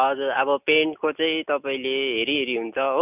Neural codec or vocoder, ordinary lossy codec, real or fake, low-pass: none; none; real; 3.6 kHz